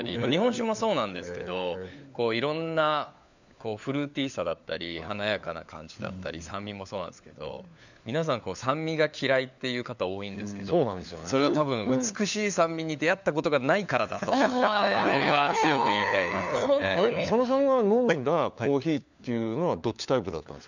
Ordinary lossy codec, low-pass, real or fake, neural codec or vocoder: none; 7.2 kHz; fake; codec, 16 kHz, 4 kbps, FunCodec, trained on LibriTTS, 50 frames a second